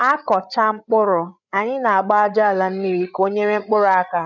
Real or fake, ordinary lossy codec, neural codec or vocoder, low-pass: fake; none; codec, 16 kHz, 8 kbps, FreqCodec, larger model; 7.2 kHz